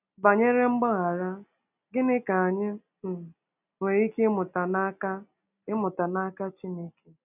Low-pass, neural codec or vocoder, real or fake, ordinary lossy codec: 3.6 kHz; none; real; none